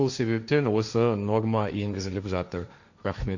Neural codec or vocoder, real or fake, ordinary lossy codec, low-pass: codec, 16 kHz, 1.1 kbps, Voila-Tokenizer; fake; none; 7.2 kHz